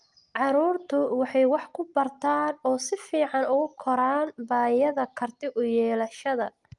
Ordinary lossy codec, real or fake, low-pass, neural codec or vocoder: Opus, 32 kbps; real; 10.8 kHz; none